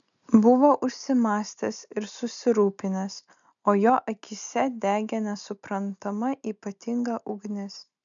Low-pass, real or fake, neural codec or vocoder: 7.2 kHz; real; none